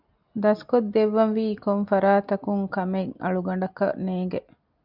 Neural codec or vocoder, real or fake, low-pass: none; real; 5.4 kHz